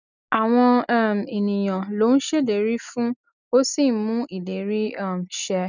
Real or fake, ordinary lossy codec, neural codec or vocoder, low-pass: real; none; none; 7.2 kHz